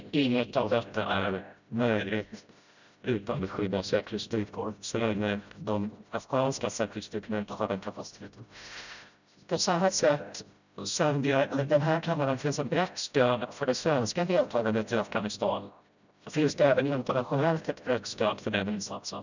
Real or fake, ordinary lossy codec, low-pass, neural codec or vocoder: fake; none; 7.2 kHz; codec, 16 kHz, 0.5 kbps, FreqCodec, smaller model